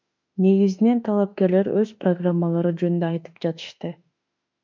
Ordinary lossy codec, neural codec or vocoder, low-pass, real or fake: MP3, 64 kbps; autoencoder, 48 kHz, 32 numbers a frame, DAC-VAE, trained on Japanese speech; 7.2 kHz; fake